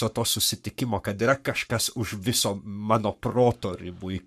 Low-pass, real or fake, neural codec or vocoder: 14.4 kHz; fake; codec, 44.1 kHz, 7.8 kbps, Pupu-Codec